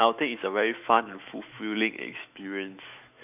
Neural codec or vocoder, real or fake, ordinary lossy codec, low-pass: autoencoder, 48 kHz, 128 numbers a frame, DAC-VAE, trained on Japanese speech; fake; none; 3.6 kHz